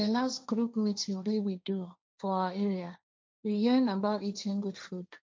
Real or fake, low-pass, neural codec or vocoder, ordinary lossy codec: fake; none; codec, 16 kHz, 1.1 kbps, Voila-Tokenizer; none